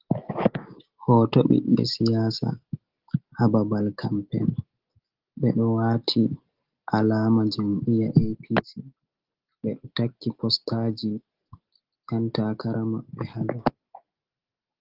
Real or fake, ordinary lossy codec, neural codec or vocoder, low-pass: real; Opus, 16 kbps; none; 5.4 kHz